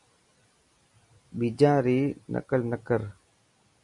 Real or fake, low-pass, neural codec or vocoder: real; 10.8 kHz; none